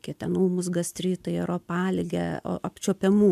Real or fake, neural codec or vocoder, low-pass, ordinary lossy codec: real; none; 14.4 kHz; MP3, 96 kbps